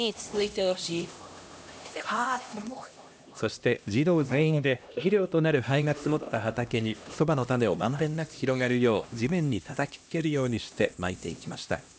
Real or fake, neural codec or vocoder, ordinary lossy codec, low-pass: fake; codec, 16 kHz, 1 kbps, X-Codec, HuBERT features, trained on LibriSpeech; none; none